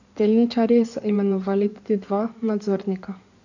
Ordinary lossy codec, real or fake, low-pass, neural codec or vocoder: none; fake; 7.2 kHz; codec, 16 kHz in and 24 kHz out, 2.2 kbps, FireRedTTS-2 codec